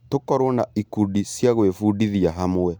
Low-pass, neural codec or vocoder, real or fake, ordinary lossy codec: none; none; real; none